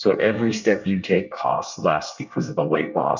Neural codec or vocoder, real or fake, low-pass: codec, 24 kHz, 1 kbps, SNAC; fake; 7.2 kHz